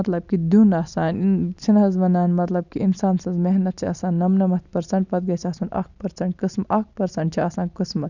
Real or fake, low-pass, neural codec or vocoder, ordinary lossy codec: real; 7.2 kHz; none; none